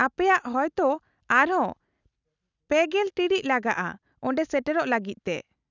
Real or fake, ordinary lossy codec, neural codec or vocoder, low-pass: real; none; none; 7.2 kHz